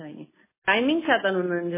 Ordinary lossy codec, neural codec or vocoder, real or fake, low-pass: MP3, 16 kbps; none; real; 3.6 kHz